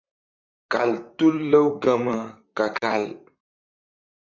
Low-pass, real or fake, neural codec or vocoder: 7.2 kHz; fake; vocoder, 44.1 kHz, 128 mel bands, Pupu-Vocoder